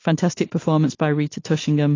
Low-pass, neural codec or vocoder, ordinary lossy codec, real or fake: 7.2 kHz; none; AAC, 32 kbps; real